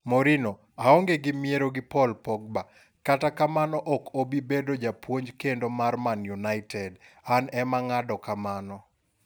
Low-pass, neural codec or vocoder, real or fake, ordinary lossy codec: none; none; real; none